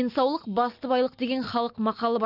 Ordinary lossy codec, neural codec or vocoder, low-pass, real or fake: none; none; 5.4 kHz; real